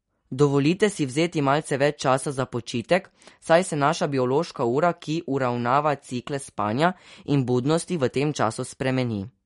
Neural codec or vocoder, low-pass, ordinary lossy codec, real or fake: none; 10.8 kHz; MP3, 48 kbps; real